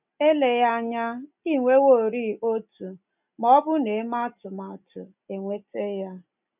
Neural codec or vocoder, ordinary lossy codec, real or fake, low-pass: none; none; real; 3.6 kHz